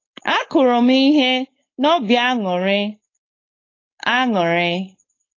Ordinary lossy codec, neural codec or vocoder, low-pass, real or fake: AAC, 32 kbps; codec, 16 kHz, 4.8 kbps, FACodec; 7.2 kHz; fake